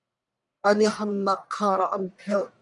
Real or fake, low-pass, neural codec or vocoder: fake; 10.8 kHz; codec, 44.1 kHz, 1.7 kbps, Pupu-Codec